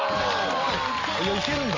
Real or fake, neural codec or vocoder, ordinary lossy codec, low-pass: real; none; Opus, 32 kbps; 7.2 kHz